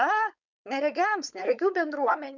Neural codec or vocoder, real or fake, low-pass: codec, 16 kHz, 4.8 kbps, FACodec; fake; 7.2 kHz